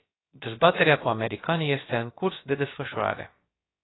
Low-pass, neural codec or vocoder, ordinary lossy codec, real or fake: 7.2 kHz; codec, 16 kHz, about 1 kbps, DyCAST, with the encoder's durations; AAC, 16 kbps; fake